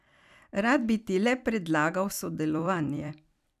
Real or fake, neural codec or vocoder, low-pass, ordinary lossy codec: fake; vocoder, 44.1 kHz, 128 mel bands every 256 samples, BigVGAN v2; 14.4 kHz; none